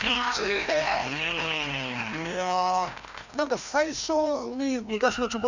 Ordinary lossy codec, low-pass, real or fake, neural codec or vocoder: none; 7.2 kHz; fake; codec, 16 kHz, 1 kbps, FreqCodec, larger model